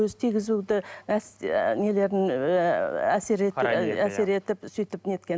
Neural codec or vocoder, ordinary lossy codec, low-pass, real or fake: none; none; none; real